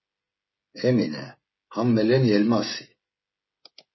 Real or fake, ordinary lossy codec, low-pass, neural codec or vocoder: fake; MP3, 24 kbps; 7.2 kHz; codec, 16 kHz, 16 kbps, FreqCodec, smaller model